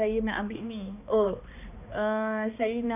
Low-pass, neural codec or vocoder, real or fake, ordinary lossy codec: 3.6 kHz; codec, 16 kHz, 2 kbps, X-Codec, HuBERT features, trained on balanced general audio; fake; none